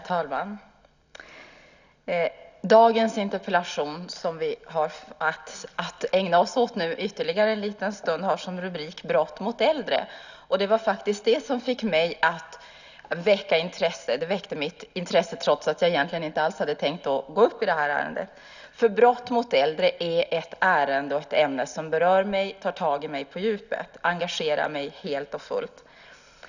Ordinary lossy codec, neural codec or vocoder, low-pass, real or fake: none; none; 7.2 kHz; real